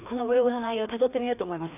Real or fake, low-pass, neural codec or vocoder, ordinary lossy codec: fake; 3.6 kHz; codec, 16 kHz, 2 kbps, FreqCodec, larger model; none